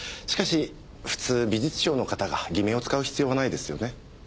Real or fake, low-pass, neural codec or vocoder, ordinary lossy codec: real; none; none; none